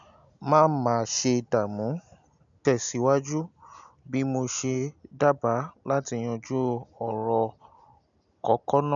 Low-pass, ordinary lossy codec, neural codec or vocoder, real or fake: 7.2 kHz; none; none; real